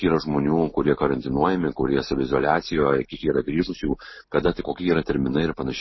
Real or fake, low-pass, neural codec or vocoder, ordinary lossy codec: real; 7.2 kHz; none; MP3, 24 kbps